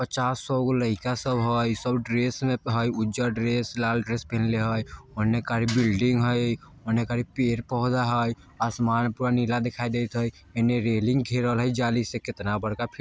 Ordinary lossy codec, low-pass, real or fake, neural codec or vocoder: none; none; real; none